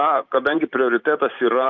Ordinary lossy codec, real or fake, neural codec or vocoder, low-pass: Opus, 24 kbps; fake; vocoder, 44.1 kHz, 128 mel bands every 512 samples, BigVGAN v2; 7.2 kHz